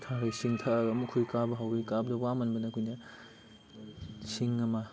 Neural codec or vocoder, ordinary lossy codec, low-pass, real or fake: none; none; none; real